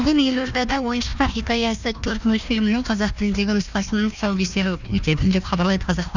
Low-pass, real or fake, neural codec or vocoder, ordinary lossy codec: 7.2 kHz; fake; codec, 16 kHz, 1 kbps, FunCodec, trained on Chinese and English, 50 frames a second; none